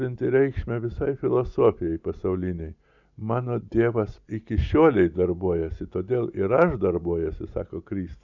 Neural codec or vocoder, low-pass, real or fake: none; 7.2 kHz; real